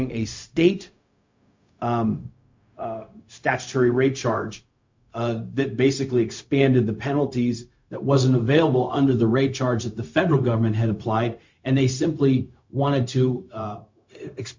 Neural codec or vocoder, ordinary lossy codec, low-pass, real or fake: codec, 16 kHz, 0.4 kbps, LongCat-Audio-Codec; MP3, 48 kbps; 7.2 kHz; fake